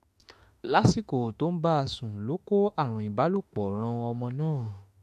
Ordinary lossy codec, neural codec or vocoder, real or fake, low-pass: MP3, 64 kbps; autoencoder, 48 kHz, 32 numbers a frame, DAC-VAE, trained on Japanese speech; fake; 14.4 kHz